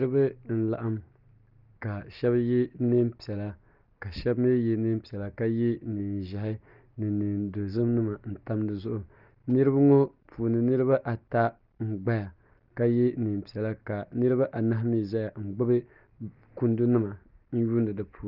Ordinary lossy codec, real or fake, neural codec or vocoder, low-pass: Opus, 32 kbps; real; none; 5.4 kHz